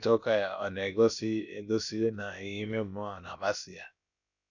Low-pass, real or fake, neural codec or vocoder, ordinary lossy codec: 7.2 kHz; fake; codec, 16 kHz, about 1 kbps, DyCAST, with the encoder's durations; none